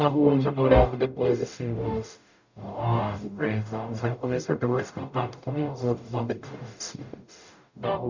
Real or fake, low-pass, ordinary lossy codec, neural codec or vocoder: fake; 7.2 kHz; none; codec, 44.1 kHz, 0.9 kbps, DAC